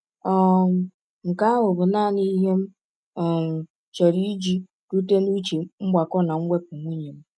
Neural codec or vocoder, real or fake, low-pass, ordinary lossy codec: none; real; none; none